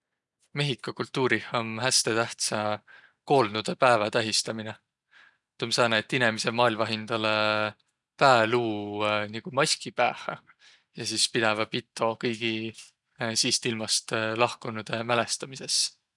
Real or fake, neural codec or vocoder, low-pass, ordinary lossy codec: real; none; 10.8 kHz; none